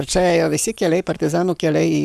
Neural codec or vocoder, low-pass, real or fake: codec, 44.1 kHz, 7.8 kbps, Pupu-Codec; 14.4 kHz; fake